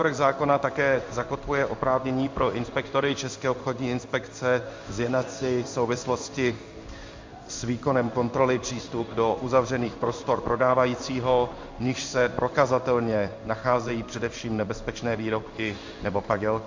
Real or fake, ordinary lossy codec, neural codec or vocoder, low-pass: fake; MP3, 64 kbps; codec, 16 kHz in and 24 kHz out, 1 kbps, XY-Tokenizer; 7.2 kHz